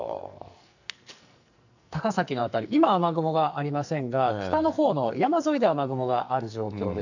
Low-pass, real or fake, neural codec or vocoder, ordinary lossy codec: 7.2 kHz; fake; codec, 44.1 kHz, 2.6 kbps, SNAC; none